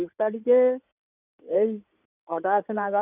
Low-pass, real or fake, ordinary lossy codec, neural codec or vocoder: 3.6 kHz; fake; none; codec, 16 kHz in and 24 kHz out, 2.2 kbps, FireRedTTS-2 codec